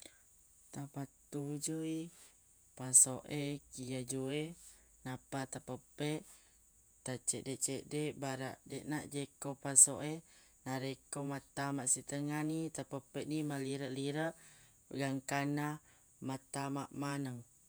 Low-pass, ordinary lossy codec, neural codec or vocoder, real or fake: none; none; vocoder, 48 kHz, 128 mel bands, Vocos; fake